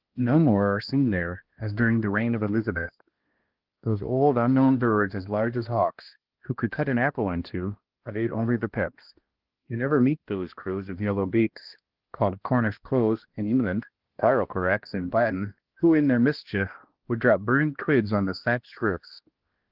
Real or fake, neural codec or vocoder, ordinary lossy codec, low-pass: fake; codec, 16 kHz, 1 kbps, X-Codec, HuBERT features, trained on balanced general audio; Opus, 16 kbps; 5.4 kHz